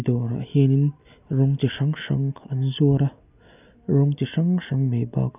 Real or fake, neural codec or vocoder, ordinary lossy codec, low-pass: real; none; none; 3.6 kHz